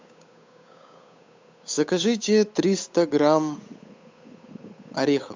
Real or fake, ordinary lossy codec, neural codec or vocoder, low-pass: fake; MP3, 64 kbps; codec, 16 kHz, 8 kbps, FunCodec, trained on Chinese and English, 25 frames a second; 7.2 kHz